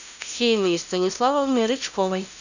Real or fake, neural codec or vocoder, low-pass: fake; codec, 16 kHz, 0.5 kbps, FunCodec, trained on LibriTTS, 25 frames a second; 7.2 kHz